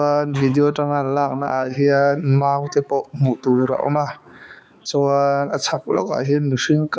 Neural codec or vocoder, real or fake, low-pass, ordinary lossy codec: codec, 16 kHz, 4 kbps, X-Codec, HuBERT features, trained on balanced general audio; fake; none; none